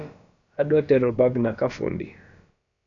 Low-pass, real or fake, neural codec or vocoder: 7.2 kHz; fake; codec, 16 kHz, about 1 kbps, DyCAST, with the encoder's durations